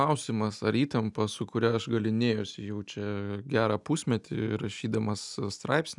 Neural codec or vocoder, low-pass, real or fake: none; 10.8 kHz; real